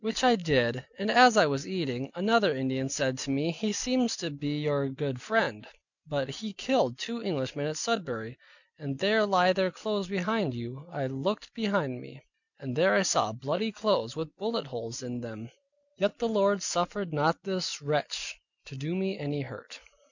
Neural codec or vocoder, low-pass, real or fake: none; 7.2 kHz; real